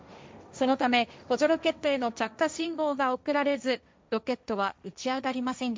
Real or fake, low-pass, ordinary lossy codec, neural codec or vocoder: fake; none; none; codec, 16 kHz, 1.1 kbps, Voila-Tokenizer